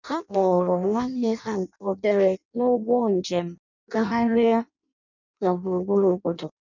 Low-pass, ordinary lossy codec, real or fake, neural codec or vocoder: 7.2 kHz; none; fake; codec, 16 kHz in and 24 kHz out, 0.6 kbps, FireRedTTS-2 codec